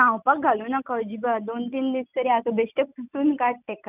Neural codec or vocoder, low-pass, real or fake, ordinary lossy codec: none; 3.6 kHz; real; none